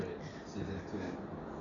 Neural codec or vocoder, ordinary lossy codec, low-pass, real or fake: none; Opus, 64 kbps; 7.2 kHz; real